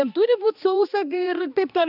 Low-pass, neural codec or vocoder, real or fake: 5.4 kHz; codec, 16 kHz, 2 kbps, X-Codec, HuBERT features, trained on balanced general audio; fake